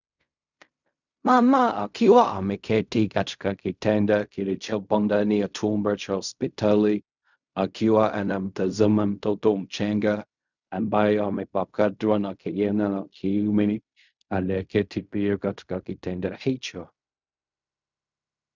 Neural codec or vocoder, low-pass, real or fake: codec, 16 kHz in and 24 kHz out, 0.4 kbps, LongCat-Audio-Codec, fine tuned four codebook decoder; 7.2 kHz; fake